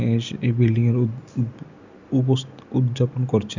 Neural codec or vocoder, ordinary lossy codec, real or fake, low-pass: none; none; real; 7.2 kHz